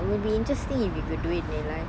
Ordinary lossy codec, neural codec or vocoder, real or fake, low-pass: none; none; real; none